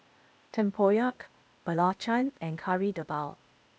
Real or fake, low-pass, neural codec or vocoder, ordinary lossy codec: fake; none; codec, 16 kHz, 0.8 kbps, ZipCodec; none